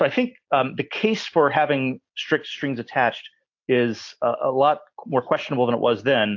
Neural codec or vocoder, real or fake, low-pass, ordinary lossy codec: none; real; 7.2 kHz; AAC, 48 kbps